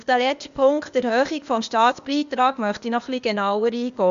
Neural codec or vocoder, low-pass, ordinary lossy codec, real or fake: codec, 16 kHz, 0.8 kbps, ZipCodec; 7.2 kHz; MP3, 64 kbps; fake